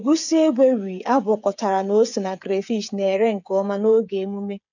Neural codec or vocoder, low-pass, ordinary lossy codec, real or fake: codec, 16 kHz, 8 kbps, FreqCodec, smaller model; 7.2 kHz; none; fake